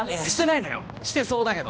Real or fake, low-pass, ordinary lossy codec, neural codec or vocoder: fake; none; none; codec, 16 kHz, 1 kbps, X-Codec, HuBERT features, trained on balanced general audio